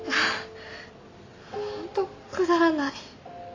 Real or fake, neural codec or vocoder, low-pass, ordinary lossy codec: real; none; 7.2 kHz; AAC, 32 kbps